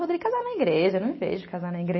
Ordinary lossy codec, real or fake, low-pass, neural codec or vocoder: MP3, 24 kbps; real; 7.2 kHz; none